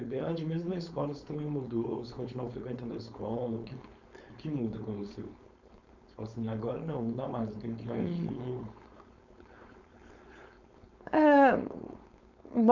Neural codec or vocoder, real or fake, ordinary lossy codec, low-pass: codec, 16 kHz, 4.8 kbps, FACodec; fake; none; 7.2 kHz